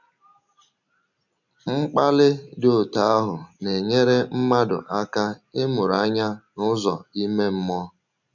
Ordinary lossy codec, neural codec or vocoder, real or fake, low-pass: none; none; real; 7.2 kHz